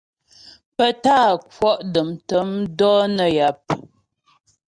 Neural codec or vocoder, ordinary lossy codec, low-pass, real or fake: none; Opus, 64 kbps; 9.9 kHz; real